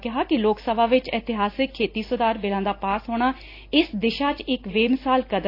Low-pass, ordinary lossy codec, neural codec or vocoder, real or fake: 5.4 kHz; AAC, 32 kbps; none; real